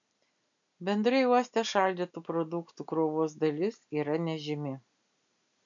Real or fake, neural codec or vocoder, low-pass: real; none; 7.2 kHz